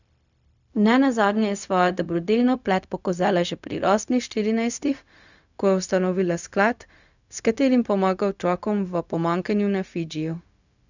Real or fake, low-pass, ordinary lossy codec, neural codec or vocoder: fake; 7.2 kHz; none; codec, 16 kHz, 0.4 kbps, LongCat-Audio-Codec